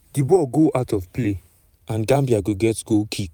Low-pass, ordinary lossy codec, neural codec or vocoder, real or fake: 19.8 kHz; none; vocoder, 44.1 kHz, 128 mel bands every 512 samples, BigVGAN v2; fake